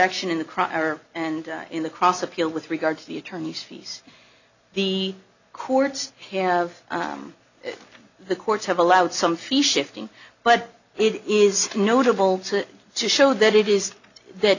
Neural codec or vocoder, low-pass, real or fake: none; 7.2 kHz; real